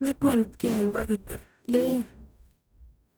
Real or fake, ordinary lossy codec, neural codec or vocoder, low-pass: fake; none; codec, 44.1 kHz, 0.9 kbps, DAC; none